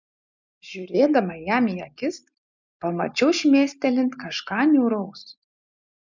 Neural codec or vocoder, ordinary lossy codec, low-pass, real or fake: none; MP3, 64 kbps; 7.2 kHz; real